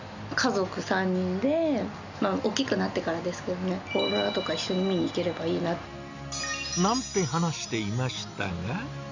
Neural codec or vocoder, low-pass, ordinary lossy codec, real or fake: none; 7.2 kHz; none; real